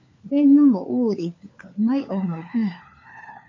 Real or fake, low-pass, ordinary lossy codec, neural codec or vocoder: fake; 7.2 kHz; MP3, 48 kbps; codec, 16 kHz, 4 kbps, FunCodec, trained on LibriTTS, 50 frames a second